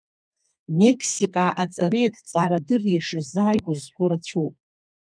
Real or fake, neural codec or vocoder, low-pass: fake; codec, 32 kHz, 1.9 kbps, SNAC; 9.9 kHz